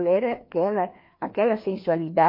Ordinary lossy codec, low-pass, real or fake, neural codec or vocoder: MP3, 32 kbps; 5.4 kHz; fake; codec, 16 kHz, 2 kbps, FreqCodec, larger model